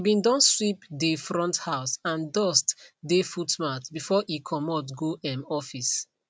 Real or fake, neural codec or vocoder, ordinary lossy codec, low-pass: real; none; none; none